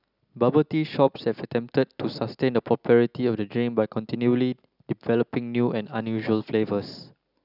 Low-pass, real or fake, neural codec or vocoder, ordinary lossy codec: 5.4 kHz; real; none; none